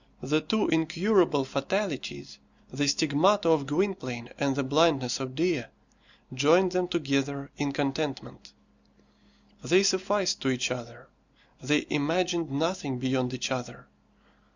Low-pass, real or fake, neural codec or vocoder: 7.2 kHz; real; none